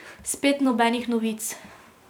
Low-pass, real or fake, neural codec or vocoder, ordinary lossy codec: none; fake; vocoder, 44.1 kHz, 128 mel bands every 256 samples, BigVGAN v2; none